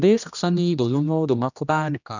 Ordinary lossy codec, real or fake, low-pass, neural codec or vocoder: none; fake; 7.2 kHz; codec, 16 kHz, 1 kbps, X-Codec, HuBERT features, trained on general audio